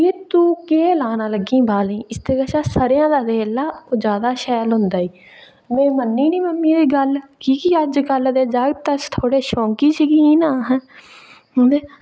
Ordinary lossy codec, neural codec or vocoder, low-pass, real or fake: none; none; none; real